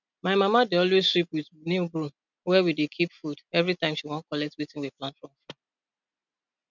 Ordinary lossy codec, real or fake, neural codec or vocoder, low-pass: none; real; none; 7.2 kHz